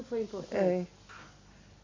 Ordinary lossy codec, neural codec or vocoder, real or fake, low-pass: MP3, 64 kbps; none; real; 7.2 kHz